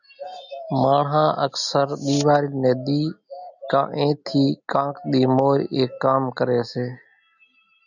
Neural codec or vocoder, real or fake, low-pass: none; real; 7.2 kHz